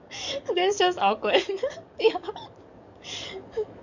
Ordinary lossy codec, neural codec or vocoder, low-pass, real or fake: none; codec, 44.1 kHz, 7.8 kbps, DAC; 7.2 kHz; fake